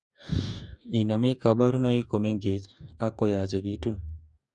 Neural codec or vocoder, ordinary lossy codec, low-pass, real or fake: codec, 44.1 kHz, 2.6 kbps, DAC; none; 10.8 kHz; fake